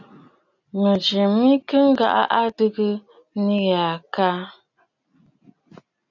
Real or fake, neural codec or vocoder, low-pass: real; none; 7.2 kHz